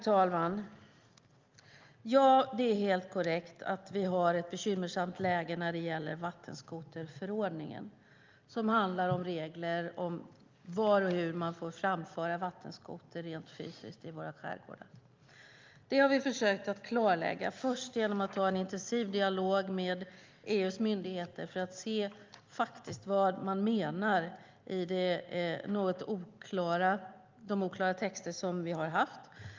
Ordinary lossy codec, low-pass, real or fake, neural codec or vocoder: Opus, 24 kbps; 7.2 kHz; real; none